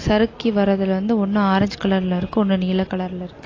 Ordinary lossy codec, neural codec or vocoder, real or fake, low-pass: AAC, 32 kbps; none; real; 7.2 kHz